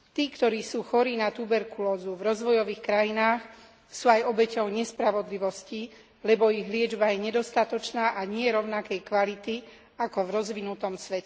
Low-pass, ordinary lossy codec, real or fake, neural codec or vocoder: none; none; real; none